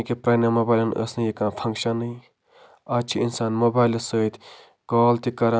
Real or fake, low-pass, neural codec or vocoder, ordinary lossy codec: real; none; none; none